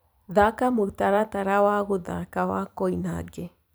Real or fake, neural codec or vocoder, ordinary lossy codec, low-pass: fake; vocoder, 44.1 kHz, 128 mel bands every 256 samples, BigVGAN v2; none; none